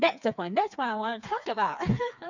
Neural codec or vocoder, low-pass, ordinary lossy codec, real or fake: codec, 16 kHz, 4 kbps, FreqCodec, smaller model; 7.2 kHz; none; fake